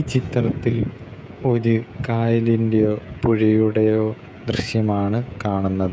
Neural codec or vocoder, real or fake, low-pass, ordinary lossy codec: codec, 16 kHz, 16 kbps, FreqCodec, smaller model; fake; none; none